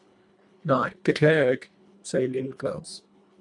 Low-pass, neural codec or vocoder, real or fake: 10.8 kHz; codec, 24 kHz, 1.5 kbps, HILCodec; fake